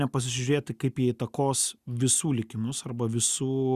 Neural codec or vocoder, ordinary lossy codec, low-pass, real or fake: none; Opus, 64 kbps; 14.4 kHz; real